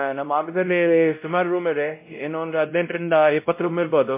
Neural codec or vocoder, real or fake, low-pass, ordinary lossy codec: codec, 16 kHz, 0.5 kbps, X-Codec, WavLM features, trained on Multilingual LibriSpeech; fake; 3.6 kHz; MP3, 24 kbps